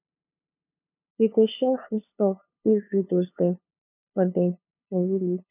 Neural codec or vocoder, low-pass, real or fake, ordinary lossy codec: codec, 16 kHz, 2 kbps, FunCodec, trained on LibriTTS, 25 frames a second; 3.6 kHz; fake; none